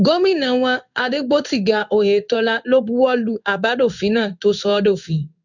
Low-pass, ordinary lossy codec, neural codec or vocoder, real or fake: 7.2 kHz; none; codec, 16 kHz in and 24 kHz out, 1 kbps, XY-Tokenizer; fake